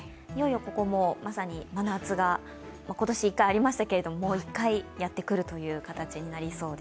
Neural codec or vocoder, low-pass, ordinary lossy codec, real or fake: none; none; none; real